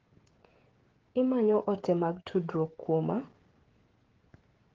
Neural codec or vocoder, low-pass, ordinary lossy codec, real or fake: codec, 16 kHz, 16 kbps, FreqCodec, smaller model; 7.2 kHz; Opus, 16 kbps; fake